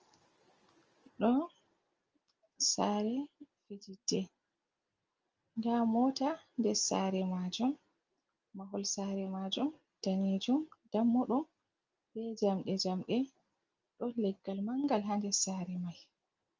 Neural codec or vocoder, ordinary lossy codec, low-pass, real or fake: none; Opus, 24 kbps; 7.2 kHz; real